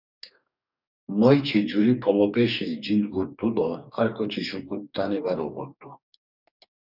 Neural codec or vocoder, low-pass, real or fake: codec, 44.1 kHz, 2.6 kbps, DAC; 5.4 kHz; fake